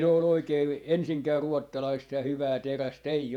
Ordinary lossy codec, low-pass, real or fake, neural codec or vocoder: none; 19.8 kHz; fake; vocoder, 48 kHz, 128 mel bands, Vocos